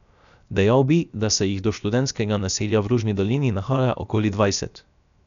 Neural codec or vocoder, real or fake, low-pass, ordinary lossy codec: codec, 16 kHz, 0.7 kbps, FocalCodec; fake; 7.2 kHz; none